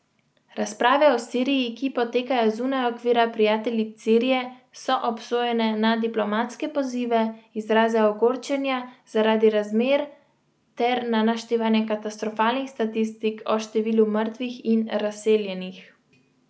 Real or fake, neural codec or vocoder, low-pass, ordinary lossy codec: real; none; none; none